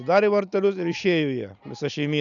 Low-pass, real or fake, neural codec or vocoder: 7.2 kHz; real; none